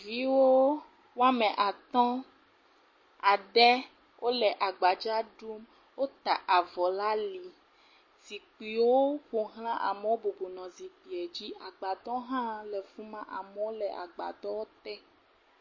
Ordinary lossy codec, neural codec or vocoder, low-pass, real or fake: MP3, 32 kbps; none; 7.2 kHz; real